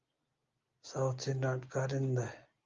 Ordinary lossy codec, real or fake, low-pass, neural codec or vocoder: Opus, 32 kbps; real; 7.2 kHz; none